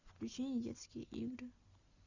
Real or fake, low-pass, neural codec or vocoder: real; 7.2 kHz; none